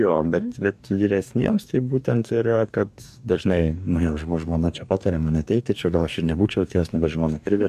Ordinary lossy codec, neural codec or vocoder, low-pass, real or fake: MP3, 96 kbps; codec, 44.1 kHz, 2.6 kbps, DAC; 14.4 kHz; fake